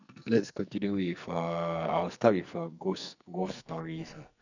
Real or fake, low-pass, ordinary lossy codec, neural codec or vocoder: fake; 7.2 kHz; none; codec, 44.1 kHz, 2.6 kbps, SNAC